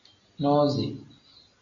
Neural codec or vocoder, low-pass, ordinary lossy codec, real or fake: none; 7.2 kHz; AAC, 64 kbps; real